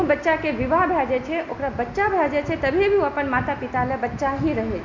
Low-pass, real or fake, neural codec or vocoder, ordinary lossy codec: 7.2 kHz; real; none; MP3, 48 kbps